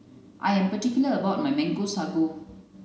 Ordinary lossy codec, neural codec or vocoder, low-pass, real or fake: none; none; none; real